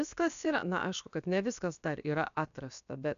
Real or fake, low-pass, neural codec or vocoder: fake; 7.2 kHz; codec, 16 kHz, about 1 kbps, DyCAST, with the encoder's durations